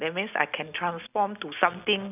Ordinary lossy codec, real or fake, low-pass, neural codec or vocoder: none; real; 3.6 kHz; none